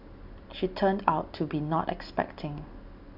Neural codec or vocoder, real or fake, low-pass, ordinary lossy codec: none; real; 5.4 kHz; none